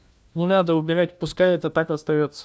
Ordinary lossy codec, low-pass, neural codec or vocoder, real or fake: none; none; codec, 16 kHz, 1 kbps, FunCodec, trained on LibriTTS, 50 frames a second; fake